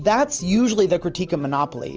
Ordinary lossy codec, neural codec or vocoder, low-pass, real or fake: Opus, 24 kbps; none; 7.2 kHz; real